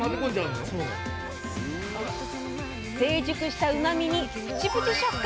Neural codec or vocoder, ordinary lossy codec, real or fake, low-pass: none; none; real; none